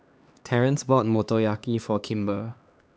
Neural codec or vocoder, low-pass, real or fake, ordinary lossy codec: codec, 16 kHz, 2 kbps, X-Codec, HuBERT features, trained on LibriSpeech; none; fake; none